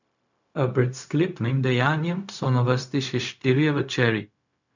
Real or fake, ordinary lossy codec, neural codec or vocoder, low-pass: fake; none; codec, 16 kHz, 0.4 kbps, LongCat-Audio-Codec; 7.2 kHz